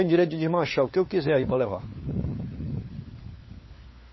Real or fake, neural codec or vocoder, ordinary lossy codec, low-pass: fake; codec, 16 kHz, 4 kbps, FunCodec, trained on LibriTTS, 50 frames a second; MP3, 24 kbps; 7.2 kHz